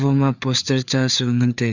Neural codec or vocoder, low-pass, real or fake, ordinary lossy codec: codec, 16 kHz, 4 kbps, FreqCodec, larger model; 7.2 kHz; fake; none